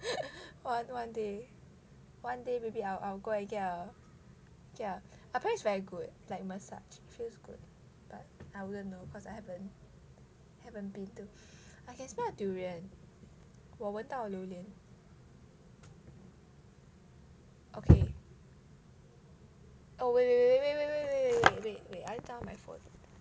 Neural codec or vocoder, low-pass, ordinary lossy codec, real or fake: none; none; none; real